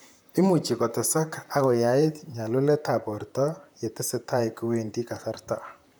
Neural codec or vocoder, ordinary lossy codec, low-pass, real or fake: vocoder, 44.1 kHz, 128 mel bands, Pupu-Vocoder; none; none; fake